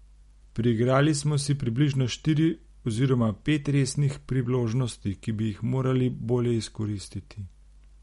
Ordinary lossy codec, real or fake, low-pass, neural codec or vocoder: MP3, 48 kbps; real; 19.8 kHz; none